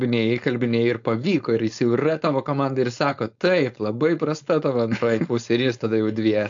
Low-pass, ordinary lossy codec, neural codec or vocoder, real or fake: 7.2 kHz; AAC, 64 kbps; codec, 16 kHz, 4.8 kbps, FACodec; fake